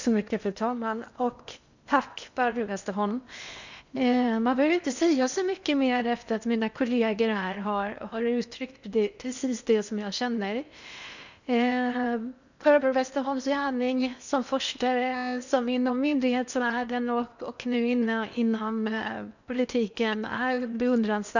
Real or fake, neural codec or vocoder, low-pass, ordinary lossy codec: fake; codec, 16 kHz in and 24 kHz out, 0.8 kbps, FocalCodec, streaming, 65536 codes; 7.2 kHz; none